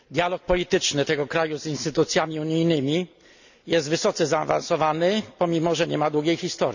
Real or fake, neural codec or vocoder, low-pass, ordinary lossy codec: real; none; 7.2 kHz; none